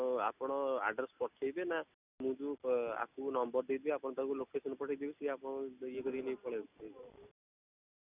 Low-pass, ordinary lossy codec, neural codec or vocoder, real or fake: 3.6 kHz; none; none; real